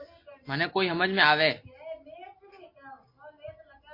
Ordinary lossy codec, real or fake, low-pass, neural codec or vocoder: MP3, 32 kbps; real; 5.4 kHz; none